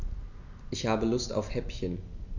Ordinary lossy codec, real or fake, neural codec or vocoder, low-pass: none; real; none; 7.2 kHz